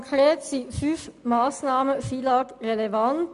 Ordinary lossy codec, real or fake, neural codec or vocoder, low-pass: MP3, 96 kbps; real; none; 10.8 kHz